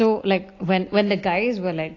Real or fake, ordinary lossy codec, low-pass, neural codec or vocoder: real; AAC, 32 kbps; 7.2 kHz; none